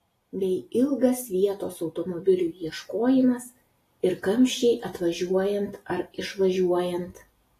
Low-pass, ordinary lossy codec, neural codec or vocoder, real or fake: 14.4 kHz; AAC, 48 kbps; none; real